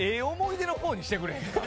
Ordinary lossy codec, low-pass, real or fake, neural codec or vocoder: none; none; real; none